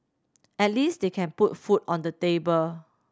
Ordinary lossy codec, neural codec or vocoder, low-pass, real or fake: none; none; none; real